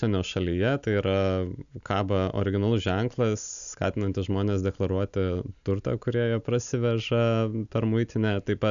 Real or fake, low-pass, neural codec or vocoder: real; 7.2 kHz; none